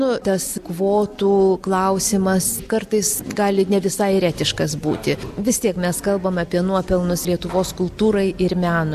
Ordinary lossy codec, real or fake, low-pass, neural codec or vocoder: AAC, 96 kbps; real; 14.4 kHz; none